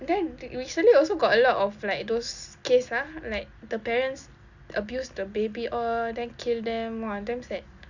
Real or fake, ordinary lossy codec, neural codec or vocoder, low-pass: real; none; none; 7.2 kHz